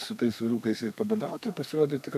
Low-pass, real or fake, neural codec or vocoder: 14.4 kHz; fake; codec, 32 kHz, 1.9 kbps, SNAC